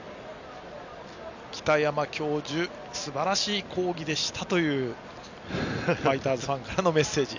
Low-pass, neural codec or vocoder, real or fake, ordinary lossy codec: 7.2 kHz; none; real; none